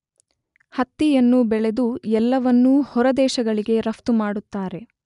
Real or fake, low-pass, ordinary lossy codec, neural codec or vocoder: real; 10.8 kHz; none; none